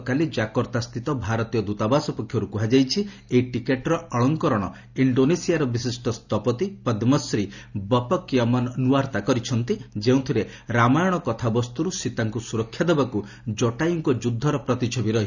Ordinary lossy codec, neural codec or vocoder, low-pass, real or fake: none; none; 7.2 kHz; real